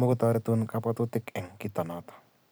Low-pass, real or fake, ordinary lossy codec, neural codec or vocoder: none; real; none; none